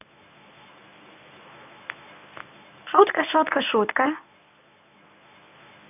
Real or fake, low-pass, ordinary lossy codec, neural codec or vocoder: fake; 3.6 kHz; none; codec, 24 kHz, 0.9 kbps, WavTokenizer, medium speech release version 1